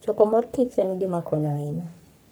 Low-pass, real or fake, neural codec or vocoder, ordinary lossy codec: none; fake; codec, 44.1 kHz, 3.4 kbps, Pupu-Codec; none